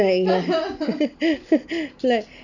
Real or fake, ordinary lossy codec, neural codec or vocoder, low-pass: real; none; none; 7.2 kHz